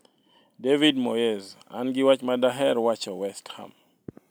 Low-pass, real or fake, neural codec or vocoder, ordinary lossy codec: none; real; none; none